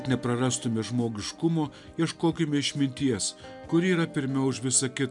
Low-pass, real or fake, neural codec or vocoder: 10.8 kHz; real; none